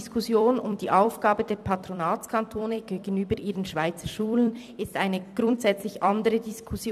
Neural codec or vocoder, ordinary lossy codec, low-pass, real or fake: vocoder, 44.1 kHz, 128 mel bands every 256 samples, BigVGAN v2; none; 14.4 kHz; fake